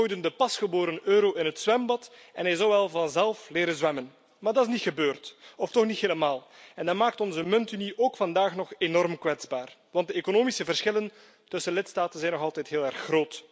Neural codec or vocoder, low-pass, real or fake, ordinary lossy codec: none; none; real; none